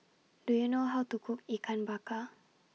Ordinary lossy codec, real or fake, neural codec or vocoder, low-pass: none; real; none; none